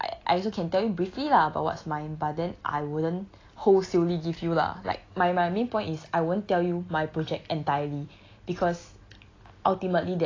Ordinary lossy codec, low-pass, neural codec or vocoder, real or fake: AAC, 32 kbps; 7.2 kHz; none; real